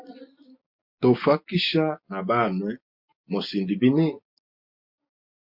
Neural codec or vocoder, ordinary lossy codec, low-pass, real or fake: none; MP3, 48 kbps; 5.4 kHz; real